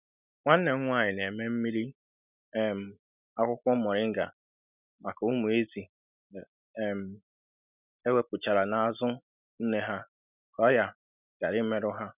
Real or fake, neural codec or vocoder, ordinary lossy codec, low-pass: real; none; none; 3.6 kHz